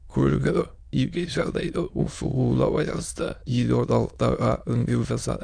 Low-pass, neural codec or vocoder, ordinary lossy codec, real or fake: 9.9 kHz; autoencoder, 22.05 kHz, a latent of 192 numbers a frame, VITS, trained on many speakers; none; fake